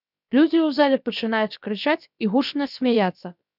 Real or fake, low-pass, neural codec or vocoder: fake; 5.4 kHz; codec, 16 kHz, about 1 kbps, DyCAST, with the encoder's durations